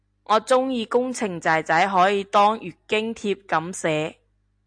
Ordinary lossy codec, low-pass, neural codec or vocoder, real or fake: AAC, 64 kbps; 9.9 kHz; none; real